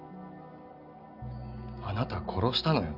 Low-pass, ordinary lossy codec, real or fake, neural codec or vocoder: 5.4 kHz; none; real; none